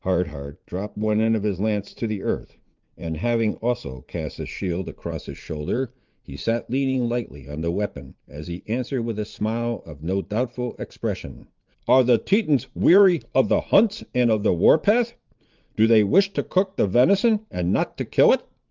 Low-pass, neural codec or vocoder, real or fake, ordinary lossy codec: 7.2 kHz; vocoder, 44.1 kHz, 80 mel bands, Vocos; fake; Opus, 24 kbps